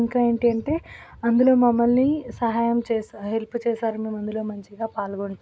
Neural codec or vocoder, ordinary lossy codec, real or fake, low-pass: none; none; real; none